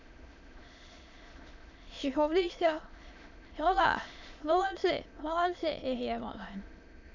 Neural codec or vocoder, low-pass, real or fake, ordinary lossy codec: autoencoder, 22.05 kHz, a latent of 192 numbers a frame, VITS, trained on many speakers; 7.2 kHz; fake; none